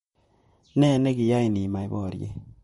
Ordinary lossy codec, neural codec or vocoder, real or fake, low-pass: MP3, 48 kbps; vocoder, 44.1 kHz, 128 mel bands, Pupu-Vocoder; fake; 19.8 kHz